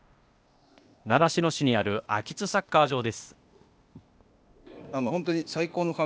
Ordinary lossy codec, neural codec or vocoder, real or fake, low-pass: none; codec, 16 kHz, 0.8 kbps, ZipCodec; fake; none